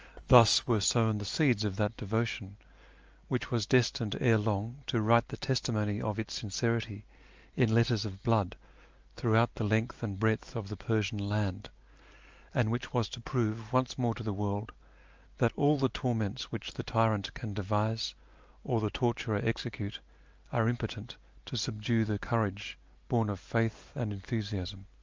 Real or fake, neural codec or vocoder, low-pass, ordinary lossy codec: real; none; 7.2 kHz; Opus, 24 kbps